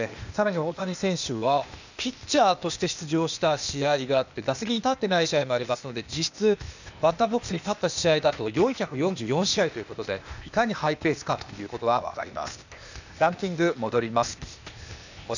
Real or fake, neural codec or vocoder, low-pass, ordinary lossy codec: fake; codec, 16 kHz, 0.8 kbps, ZipCodec; 7.2 kHz; none